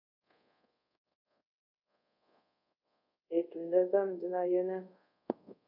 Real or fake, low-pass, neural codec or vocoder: fake; 5.4 kHz; codec, 24 kHz, 0.5 kbps, DualCodec